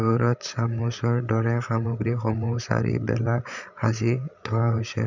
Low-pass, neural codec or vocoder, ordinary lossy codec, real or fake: 7.2 kHz; codec, 16 kHz, 8 kbps, FreqCodec, larger model; none; fake